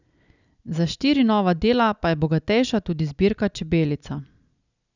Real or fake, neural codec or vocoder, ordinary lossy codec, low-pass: real; none; none; 7.2 kHz